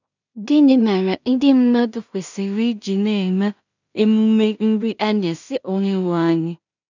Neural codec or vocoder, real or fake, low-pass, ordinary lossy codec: codec, 16 kHz in and 24 kHz out, 0.4 kbps, LongCat-Audio-Codec, two codebook decoder; fake; 7.2 kHz; none